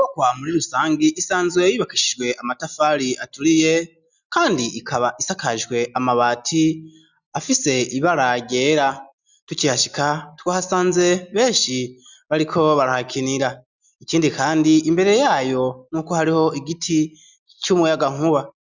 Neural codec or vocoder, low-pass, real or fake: none; 7.2 kHz; real